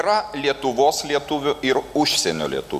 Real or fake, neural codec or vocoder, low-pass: real; none; 14.4 kHz